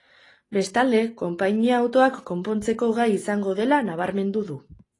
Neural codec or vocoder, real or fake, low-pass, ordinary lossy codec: none; real; 10.8 kHz; AAC, 32 kbps